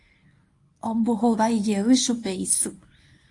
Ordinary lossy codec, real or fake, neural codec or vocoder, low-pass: AAC, 48 kbps; fake; codec, 24 kHz, 0.9 kbps, WavTokenizer, medium speech release version 1; 10.8 kHz